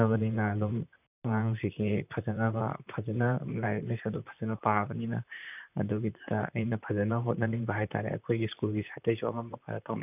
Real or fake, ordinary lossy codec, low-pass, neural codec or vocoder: fake; none; 3.6 kHz; vocoder, 44.1 kHz, 128 mel bands, Pupu-Vocoder